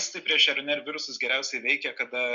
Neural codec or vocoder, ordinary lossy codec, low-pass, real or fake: none; Opus, 64 kbps; 7.2 kHz; real